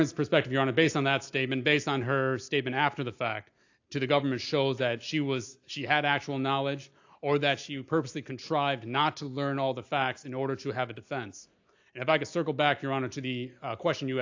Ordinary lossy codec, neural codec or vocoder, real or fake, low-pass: AAC, 48 kbps; none; real; 7.2 kHz